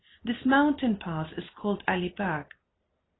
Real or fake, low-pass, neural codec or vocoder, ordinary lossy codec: real; 7.2 kHz; none; AAC, 16 kbps